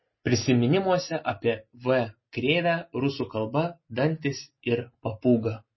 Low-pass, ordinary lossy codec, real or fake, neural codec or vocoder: 7.2 kHz; MP3, 24 kbps; real; none